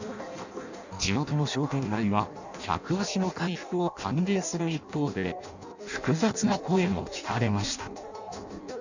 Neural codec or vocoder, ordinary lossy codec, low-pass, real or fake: codec, 16 kHz in and 24 kHz out, 0.6 kbps, FireRedTTS-2 codec; none; 7.2 kHz; fake